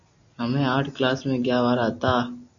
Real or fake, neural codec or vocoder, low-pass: real; none; 7.2 kHz